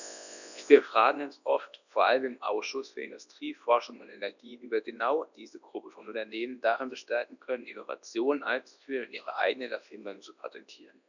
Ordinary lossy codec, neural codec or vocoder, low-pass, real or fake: none; codec, 24 kHz, 0.9 kbps, WavTokenizer, large speech release; 7.2 kHz; fake